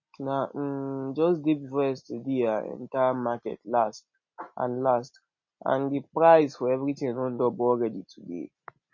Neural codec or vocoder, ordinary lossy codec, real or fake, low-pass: none; MP3, 32 kbps; real; 7.2 kHz